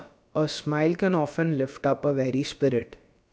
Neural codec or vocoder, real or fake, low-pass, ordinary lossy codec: codec, 16 kHz, about 1 kbps, DyCAST, with the encoder's durations; fake; none; none